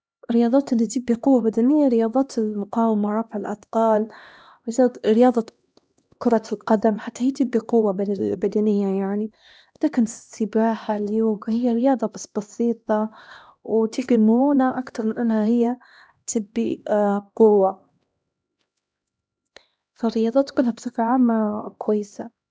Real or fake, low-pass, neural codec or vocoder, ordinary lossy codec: fake; none; codec, 16 kHz, 1 kbps, X-Codec, HuBERT features, trained on LibriSpeech; none